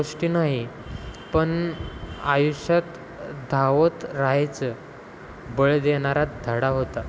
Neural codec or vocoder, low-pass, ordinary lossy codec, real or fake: none; none; none; real